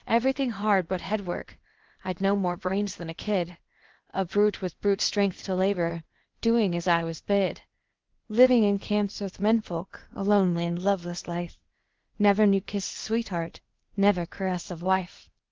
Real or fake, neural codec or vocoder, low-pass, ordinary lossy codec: fake; codec, 16 kHz, 0.8 kbps, ZipCodec; 7.2 kHz; Opus, 16 kbps